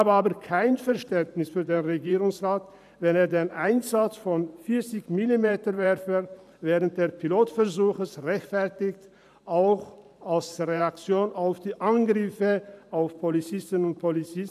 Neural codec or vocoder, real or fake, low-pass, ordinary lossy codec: vocoder, 44.1 kHz, 128 mel bands every 512 samples, BigVGAN v2; fake; 14.4 kHz; none